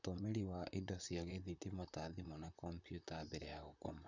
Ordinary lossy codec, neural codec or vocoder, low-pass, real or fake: none; vocoder, 44.1 kHz, 128 mel bands, Pupu-Vocoder; 7.2 kHz; fake